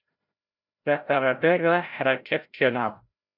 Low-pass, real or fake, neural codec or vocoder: 5.4 kHz; fake; codec, 16 kHz, 0.5 kbps, FreqCodec, larger model